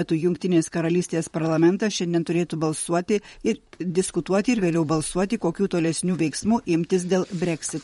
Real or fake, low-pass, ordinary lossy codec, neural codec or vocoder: fake; 19.8 kHz; MP3, 48 kbps; vocoder, 44.1 kHz, 128 mel bands, Pupu-Vocoder